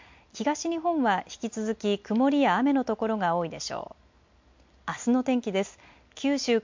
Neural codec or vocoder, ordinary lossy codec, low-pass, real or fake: none; MP3, 48 kbps; 7.2 kHz; real